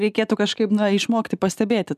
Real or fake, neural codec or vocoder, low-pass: real; none; 14.4 kHz